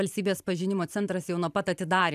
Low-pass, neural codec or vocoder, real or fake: 14.4 kHz; vocoder, 44.1 kHz, 128 mel bands every 512 samples, BigVGAN v2; fake